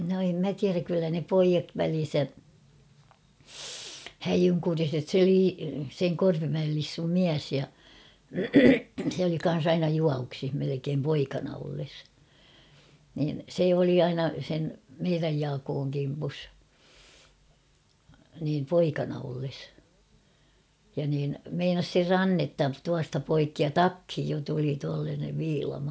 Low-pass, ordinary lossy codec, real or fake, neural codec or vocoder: none; none; real; none